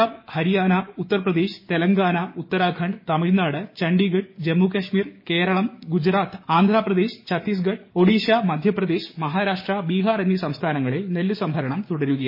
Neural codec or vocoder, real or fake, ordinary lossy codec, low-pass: codec, 16 kHz, 6 kbps, DAC; fake; MP3, 24 kbps; 5.4 kHz